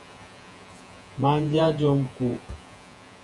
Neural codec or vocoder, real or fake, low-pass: vocoder, 48 kHz, 128 mel bands, Vocos; fake; 10.8 kHz